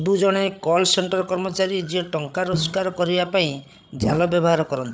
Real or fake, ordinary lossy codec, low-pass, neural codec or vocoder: fake; none; none; codec, 16 kHz, 8 kbps, FreqCodec, larger model